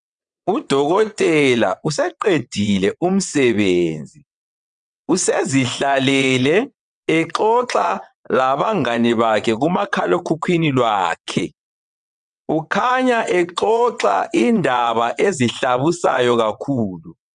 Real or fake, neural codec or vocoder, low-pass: fake; vocoder, 22.05 kHz, 80 mel bands, WaveNeXt; 9.9 kHz